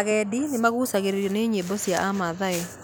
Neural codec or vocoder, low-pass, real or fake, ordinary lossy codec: none; none; real; none